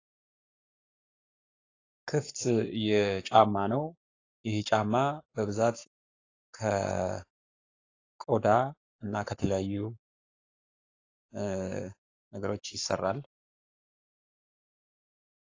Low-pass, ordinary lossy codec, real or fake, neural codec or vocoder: 7.2 kHz; AAC, 32 kbps; fake; codec, 44.1 kHz, 7.8 kbps, Pupu-Codec